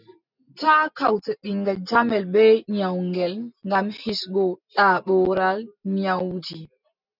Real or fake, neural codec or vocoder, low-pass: real; none; 5.4 kHz